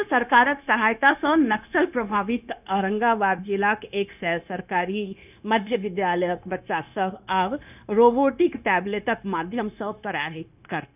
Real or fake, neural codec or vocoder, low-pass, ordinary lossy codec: fake; codec, 16 kHz, 0.9 kbps, LongCat-Audio-Codec; 3.6 kHz; none